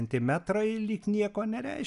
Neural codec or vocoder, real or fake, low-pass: none; real; 10.8 kHz